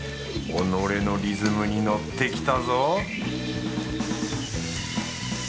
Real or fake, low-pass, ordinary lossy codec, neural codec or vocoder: real; none; none; none